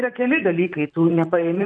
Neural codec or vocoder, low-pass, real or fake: vocoder, 44.1 kHz, 128 mel bands, Pupu-Vocoder; 9.9 kHz; fake